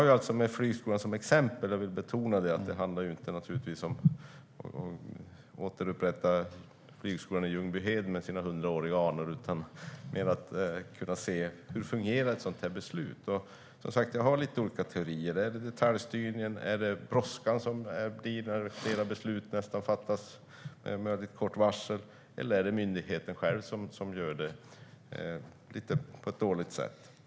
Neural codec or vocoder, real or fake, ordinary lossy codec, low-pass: none; real; none; none